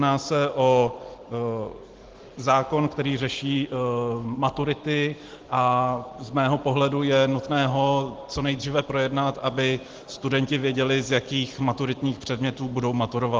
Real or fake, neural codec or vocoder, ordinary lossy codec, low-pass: real; none; Opus, 16 kbps; 7.2 kHz